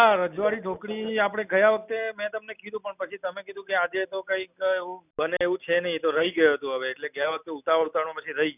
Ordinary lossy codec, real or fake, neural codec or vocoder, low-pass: none; real; none; 3.6 kHz